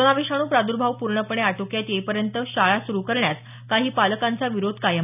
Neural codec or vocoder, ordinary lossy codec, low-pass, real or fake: none; none; 3.6 kHz; real